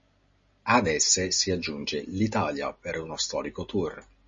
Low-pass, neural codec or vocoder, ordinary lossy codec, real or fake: 7.2 kHz; none; MP3, 32 kbps; real